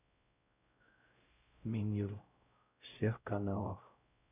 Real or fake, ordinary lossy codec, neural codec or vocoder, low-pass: fake; none; codec, 16 kHz, 0.5 kbps, X-Codec, WavLM features, trained on Multilingual LibriSpeech; 3.6 kHz